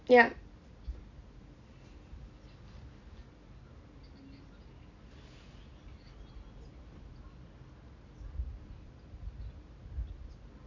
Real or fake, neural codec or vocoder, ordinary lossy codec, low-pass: real; none; none; 7.2 kHz